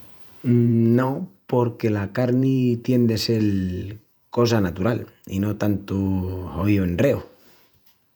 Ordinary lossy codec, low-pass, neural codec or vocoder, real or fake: none; 19.8 kHz; vocoder, 48 kHz, 128 mel bands, Vocos; fake